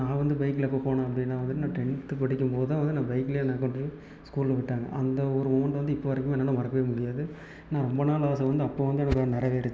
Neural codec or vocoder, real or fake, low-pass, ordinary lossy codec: none; real; none; none